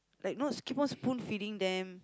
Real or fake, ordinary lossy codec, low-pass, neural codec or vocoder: real; none; none; none